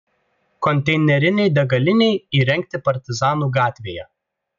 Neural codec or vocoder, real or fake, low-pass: none; real; 7.2 kHz